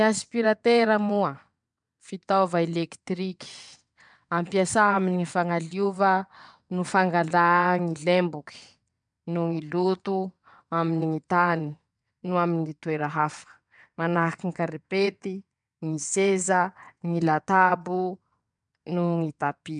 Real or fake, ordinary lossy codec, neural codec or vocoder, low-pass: fake; MP3, 96 kbps; vocoder, 22.05 kHz, 80 mel bands, WaveNeXt; 9.9 kHz